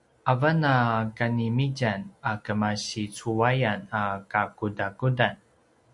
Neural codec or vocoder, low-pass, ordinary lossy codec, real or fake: none; 10.8 kHz; MP3, 64 kbps; real